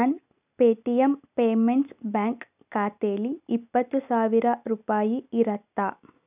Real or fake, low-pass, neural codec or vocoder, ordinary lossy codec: real; 3.6 kHz; none; none